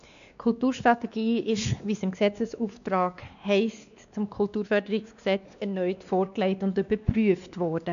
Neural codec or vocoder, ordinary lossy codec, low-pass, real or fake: codec, 16 kHz, 2 kbps, X-Codec, WavLM features, trained on Multilingual LibriSpeech; none; 7.2 kHz; fake